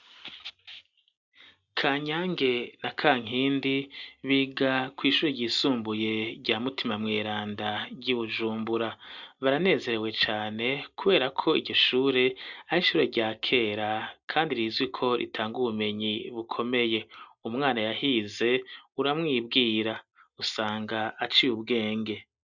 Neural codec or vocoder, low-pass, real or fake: none; 7.2 kHz; real